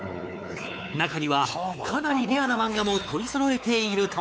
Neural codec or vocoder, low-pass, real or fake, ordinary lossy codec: codec, 16 kHz, 4 kbps, X-Codec, WavLM features, trained on Multilingual LibriSpeech; none; fake; none